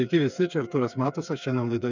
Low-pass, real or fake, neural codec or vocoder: 7.2 kHz; fake; codec, 16 kHz, 4 kbps, FreqCodec, smaller model